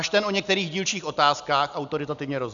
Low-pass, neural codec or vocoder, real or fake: 7.2 kHz; none; real